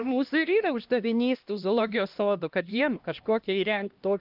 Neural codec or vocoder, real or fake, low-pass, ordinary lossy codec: codec, 16 kHz, 1 kbps, X-Codec, HuBERT features, trained on LibriSpeech; fake; 5.4 kHz; Opus, 32 kbps